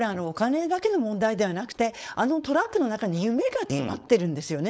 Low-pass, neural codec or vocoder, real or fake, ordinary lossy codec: none; codec, 16 kHz, 4.8 kbps, FACodec; fake; none